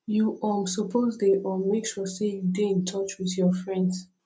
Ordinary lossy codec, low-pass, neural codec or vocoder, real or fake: none; none; none; real